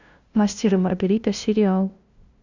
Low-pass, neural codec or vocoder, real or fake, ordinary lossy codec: 7.2 kHz; codec, 16 kHz, 0.5 kbps, FunCodec, trained on LibriTTS, 25 frames a second; fake; Opus, 64 kbps